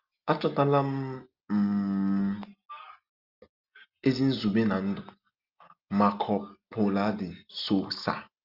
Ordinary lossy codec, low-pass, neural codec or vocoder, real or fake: Opus, 24 kbps; 5.4 kHz; none; real